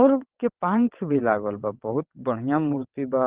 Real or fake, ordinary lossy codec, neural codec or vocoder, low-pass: fake; Opus, 16 kbps; vocoder, 22.05 kHz, 80 mel bands, WaveNeXt; 3.6 kHz